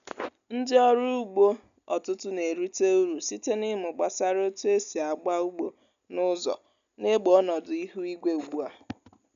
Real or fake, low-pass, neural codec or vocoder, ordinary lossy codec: real; 7.2 kHz; none; none